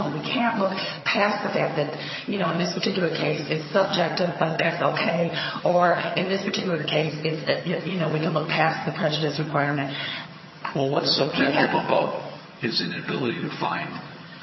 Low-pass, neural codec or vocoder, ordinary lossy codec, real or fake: 7.2 kHz; vocoder, 22.05 kHz, 80 mel bands, HiFi-GAN; MP3, 24 kbps; fake